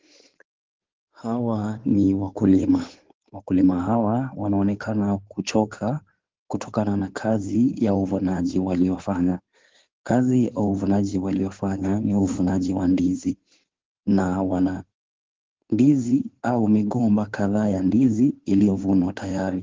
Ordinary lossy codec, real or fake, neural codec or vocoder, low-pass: Opus, 16 kbps; fake; codec, 16 kHz in and 24 kHz out, 2.2 kbps, FireRedTTS-2 codec; 7.2 kHz